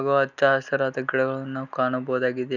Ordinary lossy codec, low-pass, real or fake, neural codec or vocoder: none; 7.2 kHz; real; none